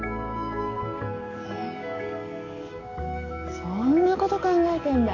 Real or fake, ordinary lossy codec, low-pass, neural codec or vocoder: fake; none; 7.2 kHz; codec, 44.1 kHz, 7.8 kbps, DAC